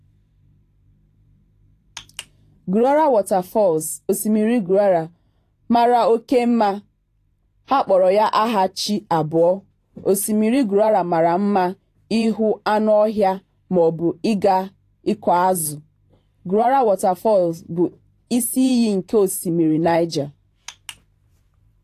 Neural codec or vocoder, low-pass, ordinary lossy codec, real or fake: vocoder, 44.1 kHz, 128 mel bands every 512 samples, BigVGAN v2; 14.4 kHz; AAC, 48 kbps; fake